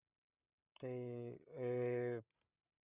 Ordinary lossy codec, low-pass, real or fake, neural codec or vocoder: Opus, 64 kbps; 3.6 kHz; fake; codec, 16 kHz, 8 kbps, FreqCodec, larger model